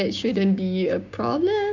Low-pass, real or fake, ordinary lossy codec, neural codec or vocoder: 7.2 kHz; fake; none; codec, 16 kHz, 6 kbps, DAC